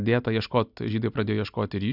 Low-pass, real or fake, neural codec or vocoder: 5.4 kHz; real; none